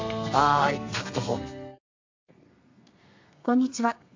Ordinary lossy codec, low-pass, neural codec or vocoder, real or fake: MP3, 48 kbps; 7.2 kHz; codec, 32 kHz, 1.9 kbps, SNAC; fake